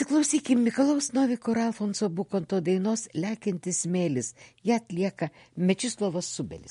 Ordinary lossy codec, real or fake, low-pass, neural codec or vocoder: MP3, 48 kbps; real; 10.8 kHz; none